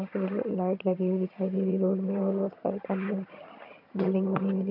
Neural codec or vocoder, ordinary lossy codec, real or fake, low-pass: vocoder, 22.05 kHz, 80 mel bands, HiFi-GAN; AAC, 48 kbps; fake; 5.4 kHz